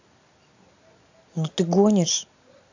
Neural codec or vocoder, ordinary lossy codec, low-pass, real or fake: none; AAC, 48 kbps; 7.2 kHz; real